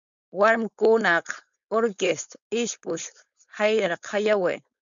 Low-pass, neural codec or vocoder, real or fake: 7.2 kHz; codec, 16 kHz, 4.8 kbps, FACodec; fake